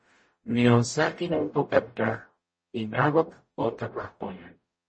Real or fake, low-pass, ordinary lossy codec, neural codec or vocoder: fake; 9.9 kHz; MP3, 32 kbps; codec, 44.1 kHz, 0.9 kbps, DAC